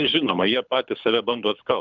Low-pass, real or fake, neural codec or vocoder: 7.2 kHz; fake; codec, 24 kHz, 6 kbps, HILCodec